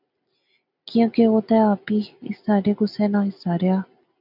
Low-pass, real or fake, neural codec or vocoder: 5.4 kHz; real; none